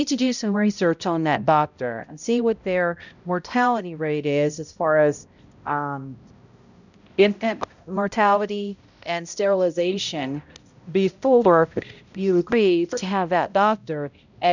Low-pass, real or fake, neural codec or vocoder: 7.2 kHz; fake; codec, 16 kHz, 0.5 kbps, X-Codec, HuBERT features, trained on balanced general audio